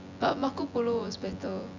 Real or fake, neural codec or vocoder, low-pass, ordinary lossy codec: fake; vocoder, 24 kHz, 100 mel bands, Vocos; 7.2 kHz; none